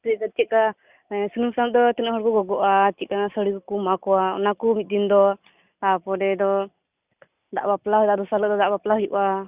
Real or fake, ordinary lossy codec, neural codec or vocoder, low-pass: real; Opus, 64 kbps; none; 3.6 kHz